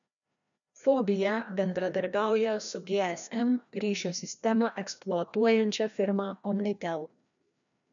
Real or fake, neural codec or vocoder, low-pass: fake; codec, 16 kHz, 1 kbps, FreqCodec, larger model; 7.2 kHz